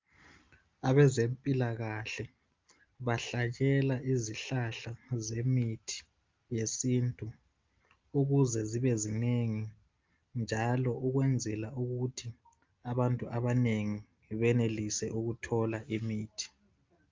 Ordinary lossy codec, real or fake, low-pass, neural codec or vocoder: Opus, 32 kbps; real; 7.2 kHz; none